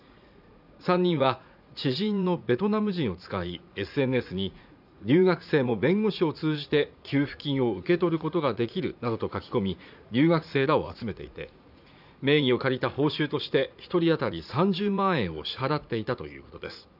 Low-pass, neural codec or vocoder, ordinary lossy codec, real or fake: 5.4 kHz; vocoder, 44.1 kHz, 80 mel bands, Vocos; none; fake